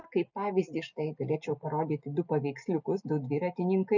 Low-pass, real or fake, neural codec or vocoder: 7.2 kHz; real; none